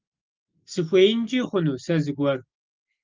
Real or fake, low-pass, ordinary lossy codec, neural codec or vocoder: real; 7.2 kHz; Opus, 32 kbps; none